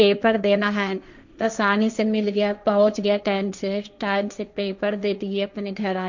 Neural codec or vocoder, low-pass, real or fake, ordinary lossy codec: codec, 16 kHz, 1.1 kbps, Voila-Tokenizer; 7.2 kHz; fake; none